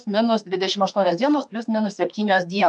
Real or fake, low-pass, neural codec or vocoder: fake; 10.8 kHz; autoencoder, 48 kHz, 32 numbers a frame, DAC-VAE, trained on Japanese speech